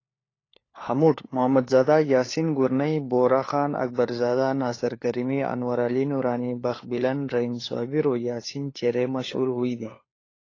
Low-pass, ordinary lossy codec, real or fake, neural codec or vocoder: 7.2 kHz; AAC, 32 kbps; fake; codec, 16 kHz, 4 kbps, FunCodec, trained on LibriTTS, 50 frames a second